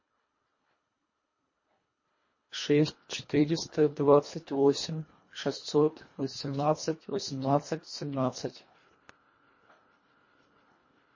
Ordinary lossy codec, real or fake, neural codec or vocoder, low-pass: MP3, 32 kbps; fake; codec, 24 kHz, 1.5 kbps, HILCodec; 7.2 kHz